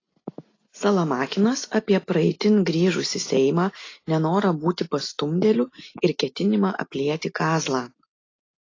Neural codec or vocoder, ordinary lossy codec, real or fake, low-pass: none; AAC, 32 kbps; real; 7.2 kHz